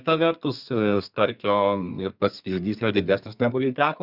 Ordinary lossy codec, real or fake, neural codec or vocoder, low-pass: Opus, 64 kbps; fake; codec, 32 kHz, 1.9 kbps, SNAC; 5.4 kHz